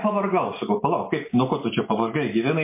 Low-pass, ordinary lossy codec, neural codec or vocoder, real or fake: 3.6 kHz; MP3, 24 kbps; none; real